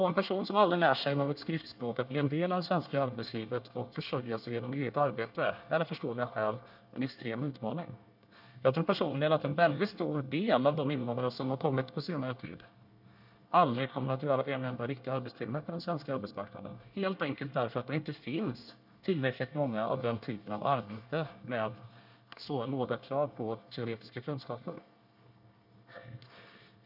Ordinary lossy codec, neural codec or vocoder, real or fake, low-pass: none; codec, 24 kHz, 1 kbps, SNAC; fake; 5.4 kHz